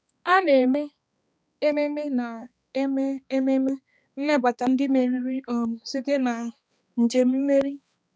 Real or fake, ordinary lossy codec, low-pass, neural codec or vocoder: fake; none; none; codec, 16 kHz, 2 kbps, X-Codec, HuBERT features, trained on balanced general audio